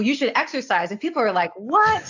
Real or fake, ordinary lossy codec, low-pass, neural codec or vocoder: real; AAC, 48 kbps; 7.2 kHz; none